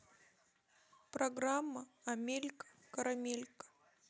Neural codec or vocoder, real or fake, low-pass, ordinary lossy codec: none; real; none; none